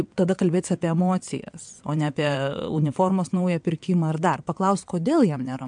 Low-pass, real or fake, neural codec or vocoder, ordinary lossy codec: 9.9 kHz; fake; vocoder, 22.05 kHz, 80 mel bands, WaveNeXt; MP3, 64 kbps